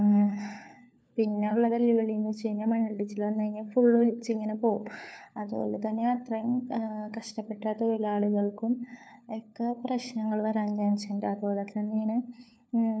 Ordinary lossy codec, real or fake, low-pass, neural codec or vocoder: none; fake; none; codec, 16 kHz, 4 kbps, FunCodec, trained on LibriTTS, 50 frames a second